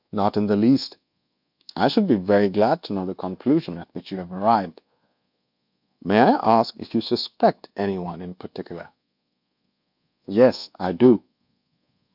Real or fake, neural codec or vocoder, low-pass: fake; codec, 24 kHz, 1.2 kbps, DualCodec; 5.4 kHz